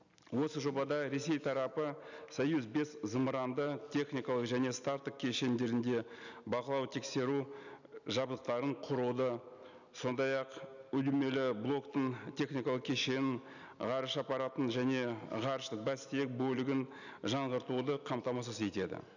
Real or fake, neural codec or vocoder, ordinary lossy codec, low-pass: real; none; none; 7.2 kHz